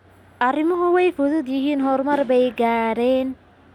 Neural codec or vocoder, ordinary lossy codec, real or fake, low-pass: none; none; real; 19.8 kHz